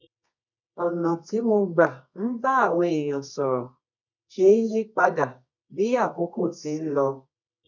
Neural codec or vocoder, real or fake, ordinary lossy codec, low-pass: codec, 24 kHz, 0.9 kbps, WavTokenizer, medium music audio release; fake; none; 7.2 kHz